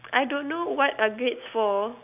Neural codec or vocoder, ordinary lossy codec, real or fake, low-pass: none; none; real; 3.6 kHz